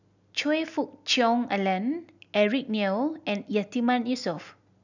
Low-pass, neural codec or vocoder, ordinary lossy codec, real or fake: 7.2 kHz; none; none; real